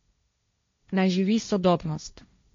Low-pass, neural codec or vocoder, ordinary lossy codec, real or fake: 7.2 kHz; codec, 16 kHz, 1.1 kbps, Voila-Tokenizer; MP3, 48 kbps; fake